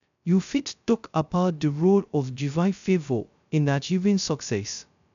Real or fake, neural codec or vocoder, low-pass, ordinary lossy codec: fake; codec, 16 kHz, 0.2 kbps, FocalCodec; 7.2 kHz; none